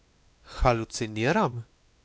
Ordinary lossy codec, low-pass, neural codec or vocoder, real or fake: none; none; codec, 16 kHz, 2 kbps, X-Codec, WavLM features, trained on Multilingual LibriSpeech; fake